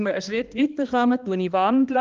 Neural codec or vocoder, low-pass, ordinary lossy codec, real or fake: codec, 16 kHz, 1 kbps, X-Codec, HuBERT features, trained on balanced general audio; 7.2 kHz; Opus, 32 kbps; fake